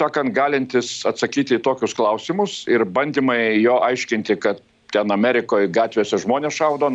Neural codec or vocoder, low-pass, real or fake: none; 9.9 kHz; real